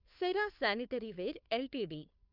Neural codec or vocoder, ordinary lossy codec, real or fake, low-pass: codec, 16 kHz, 2 kbps, FunCodec, trained on Chinese and English, 25 frames a second; none; fake; 5.4 kHz